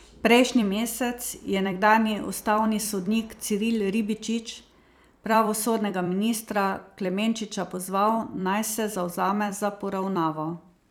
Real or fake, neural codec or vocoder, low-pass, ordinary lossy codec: fake; vocoder, 44.1 kHz, 128 mel bands every 256 samples, BigVGAN v2; none; none